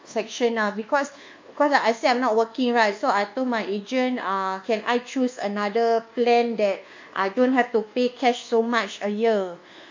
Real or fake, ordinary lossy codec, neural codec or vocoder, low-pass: fake; MP3, 48 kbps; codec, 24 kHz, 1.2 kbps, DualCodec; 7.2 kHz